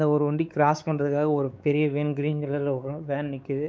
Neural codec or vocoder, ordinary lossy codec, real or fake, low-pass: codec, 16 kHz, 4 kbps, X-Codec, WavLM features, trained on Multilingual LibriSpeech; none; fake; none